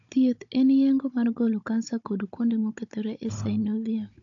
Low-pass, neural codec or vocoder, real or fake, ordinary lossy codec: 7.2 kHz; codec, 16 kHz, 16 kbps, FunCodec, trained on Chinese and English, 50 frames a second; fake; AAC, 48 kbps